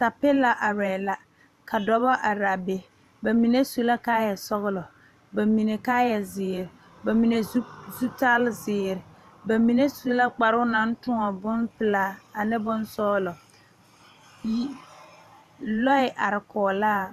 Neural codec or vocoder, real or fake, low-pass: vocoder, 44.1 kHz, 128 mel bands every 512 samples, BigVGAN v2; fake; 14.4 kHz